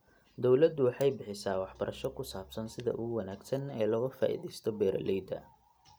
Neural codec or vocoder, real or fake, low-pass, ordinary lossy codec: none; real; none; none